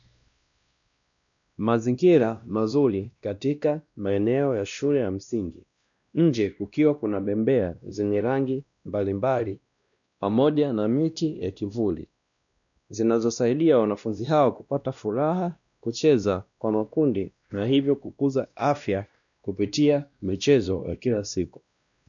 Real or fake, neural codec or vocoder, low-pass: fake; codec, 16 kHz, 1 kbps, X-Codec, WavLM features, trained on Multilingual LibriSpeech; 7.2 kHz